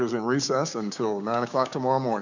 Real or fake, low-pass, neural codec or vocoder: fake; 7.2 kHz; codec, 16 kHz, 6 kbps, DAC